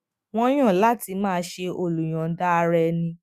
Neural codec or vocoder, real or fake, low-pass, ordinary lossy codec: autoencoder, 48 kHz, 128 numbers a frame, DAC-VAE, trained on Japanese speech; fake; 14.4 kHz; Opus, 64 kbps